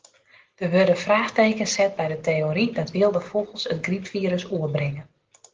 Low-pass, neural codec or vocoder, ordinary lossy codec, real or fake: 7.2 kHz; none; Opus, 16 kbps; real